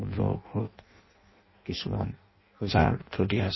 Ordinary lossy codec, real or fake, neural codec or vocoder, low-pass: MP3, 24 kbps; fake; codec, 16 kHz in and 24 kHz out, 0.6 kbps, FireRedTTS-2 codec; 7.2 kHz